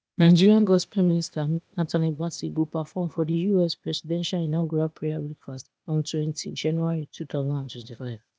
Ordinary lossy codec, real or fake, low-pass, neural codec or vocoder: none; fake; none; codec, 16 kHz, 0.8 kbps, ZipCodec